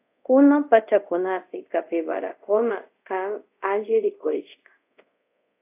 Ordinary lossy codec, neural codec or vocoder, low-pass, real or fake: AAC, 32 kbps; codec, 24 kHz, 0.5 kbps, DualCodec; 3.6 kHz; fake